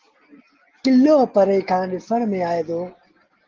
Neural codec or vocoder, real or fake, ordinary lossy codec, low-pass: none; real; Opus, 16 kbps; 7.2 kHz